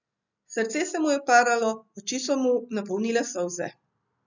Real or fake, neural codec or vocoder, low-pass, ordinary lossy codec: real; none; 7.2 kHz; none